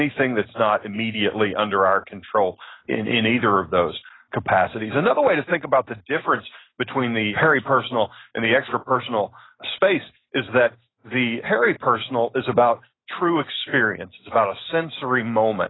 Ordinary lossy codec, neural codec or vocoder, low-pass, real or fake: AAC, 16 kbps; none; 7.2 kHz; real